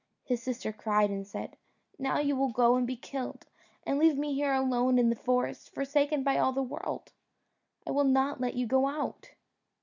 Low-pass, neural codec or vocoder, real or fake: 7.2 kHz; none; real